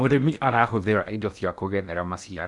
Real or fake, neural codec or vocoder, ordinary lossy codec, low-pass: fake; codec, 16 kHz in and 24 kHz out, 0.8 kbps, FocalCodec, streaming, 65536 codes; none; 10.8 kHz